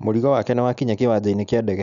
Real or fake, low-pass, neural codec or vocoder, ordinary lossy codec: real; 7.2 kHz; none; none